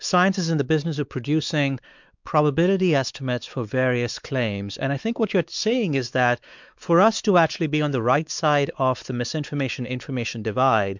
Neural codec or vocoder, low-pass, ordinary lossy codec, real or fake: codec, 16 kHz, 4 kbps, X-Codec, WavLM features, trained on Multilingual LibriSpeech; 7.2 kHz; MP3, 64 kbps; fake